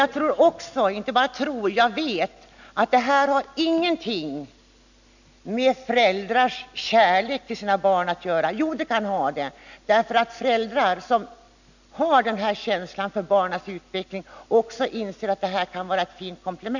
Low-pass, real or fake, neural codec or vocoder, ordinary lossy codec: 7.2 kHz; real; none; none